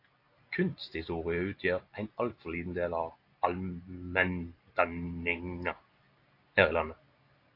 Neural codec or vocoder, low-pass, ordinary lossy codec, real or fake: none; 5.4 kHz; AAC, 32 kbps; real